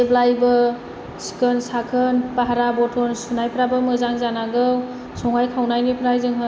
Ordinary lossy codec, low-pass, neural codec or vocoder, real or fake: none; none; none; real